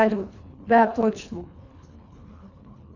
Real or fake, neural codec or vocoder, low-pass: fake; codec, 24 kHz, 1.5 kbps, HILCodec; 7.2 kHz